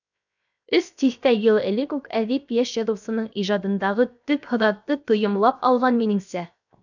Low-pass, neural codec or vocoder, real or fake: 7.2 kHz; codec, 16 kHz, 0.7 kbps, FocalCodec; fake